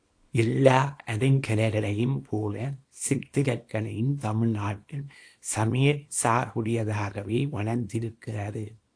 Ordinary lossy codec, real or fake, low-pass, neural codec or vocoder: AAC, 48 kbps; fake; 9.9 kHz; codec, 24 kHz, 0.9 kbps, WavTokenizer, small release